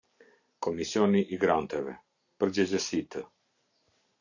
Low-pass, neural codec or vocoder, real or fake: 7.2 kHz; none; real